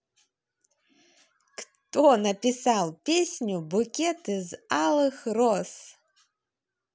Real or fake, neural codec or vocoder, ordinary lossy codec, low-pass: real; none; none; none